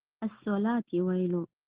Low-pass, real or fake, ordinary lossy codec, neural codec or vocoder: 3.6 kHz; real; Opus, 16 kbps; none